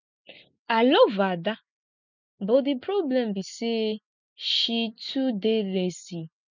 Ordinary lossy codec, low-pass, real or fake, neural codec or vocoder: none; 7.2 kHz; real; none